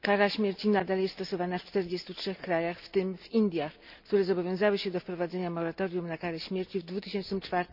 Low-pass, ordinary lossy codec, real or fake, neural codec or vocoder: 5.4 kHz; none; real; none